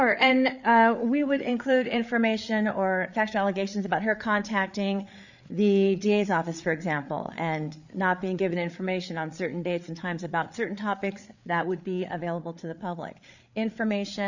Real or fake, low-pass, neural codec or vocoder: fake; 7.2 kHz; codec, 16 kHz, 8 kbps, FreqCodec, larger model